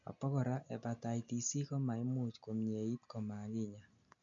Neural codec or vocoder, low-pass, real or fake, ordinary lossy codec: none; 7.2 kHz; real; MP3, 64 kbps